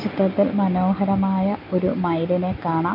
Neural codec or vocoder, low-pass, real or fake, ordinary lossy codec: none; 5.4 kHz; real; none